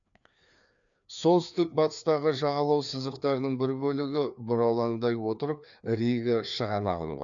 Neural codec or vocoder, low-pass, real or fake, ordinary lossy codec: codec, 16 kHz, 2 kbps, FreqCodec, larger model; 7.2 kHz; fake; none